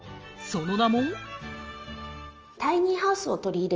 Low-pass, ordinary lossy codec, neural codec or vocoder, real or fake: 7.2 kHz; Opus, 24 kbps; none; real